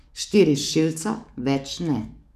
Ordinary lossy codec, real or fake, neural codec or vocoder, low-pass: none; fake; codec, 44.1 kHz, 7.8 kbps, DAC; 14.4 kHz